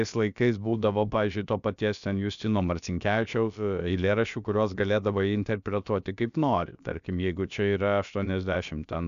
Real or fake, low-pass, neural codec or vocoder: fake; 7.2 kHz; codec, 16 kHz, about 1 kbps, DyCAST, with the encoder's durations